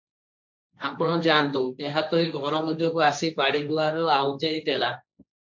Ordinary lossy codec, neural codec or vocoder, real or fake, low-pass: MP3, 48 kbps; codec, 16 kHz, 1.1 kbps, Voila-Tokenizer; fake; 7.2 kHz